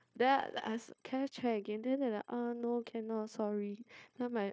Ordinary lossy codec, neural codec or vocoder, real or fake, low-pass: none; codec, 16 kHz, 0.9 kbps, LongCat-Audio-Codec; fake; none